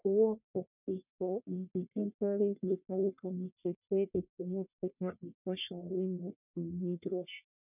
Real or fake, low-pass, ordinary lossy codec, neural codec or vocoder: fake; 3.6 kHz; none; codec, 44.1 kHz, 1.7 kbps, Pupu-Codec